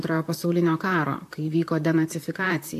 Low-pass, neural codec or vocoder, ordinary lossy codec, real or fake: 14.4 kHz; vocoder, 44.1 kHz, 128 mel bands, Pupu-Vocoder; AAC, 64 kbps; fake